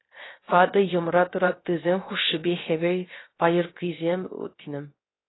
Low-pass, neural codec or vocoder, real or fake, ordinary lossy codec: 7.2 kHz; codec, 16 kHz, 0.3 kbps, FocalCodec; fake; AAC, 16 kbps